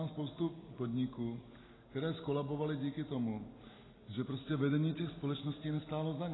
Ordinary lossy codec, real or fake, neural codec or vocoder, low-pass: AAC, 16 kbps; real; none; 7.2 kHz